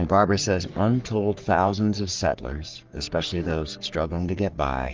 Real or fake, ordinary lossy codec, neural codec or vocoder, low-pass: fake; Opus, 32 kbps; codec, 44.1 kHz, 3.4 kbps, Pupu-Codec; 7.2 kHz